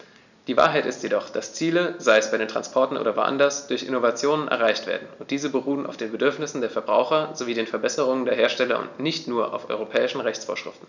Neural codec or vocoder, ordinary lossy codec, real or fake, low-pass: none; none; real; 7.2 kHz